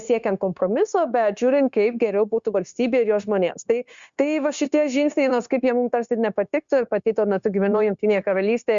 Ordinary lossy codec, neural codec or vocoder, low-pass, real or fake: Opus, 64 kbps; codec, 16 kHz, 0.9 kbps, LongCat-Audio-Codec; 7.2 kHz; fake